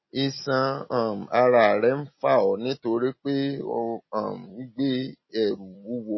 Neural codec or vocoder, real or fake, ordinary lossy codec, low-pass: none; real; MP3, 24 kbps; 7.2 kHz